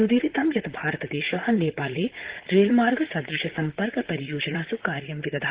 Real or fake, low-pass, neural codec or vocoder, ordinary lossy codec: fake; 3.6 kHz; vocoder, 44.1 kHz, 128 mel bands, Pupu-Vocoder; Opus, 16 kbps